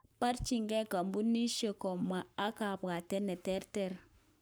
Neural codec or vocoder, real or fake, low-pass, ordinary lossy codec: codec, 44.1 kHz, 7.8 kbps, Pupu-Codec; fake; none; none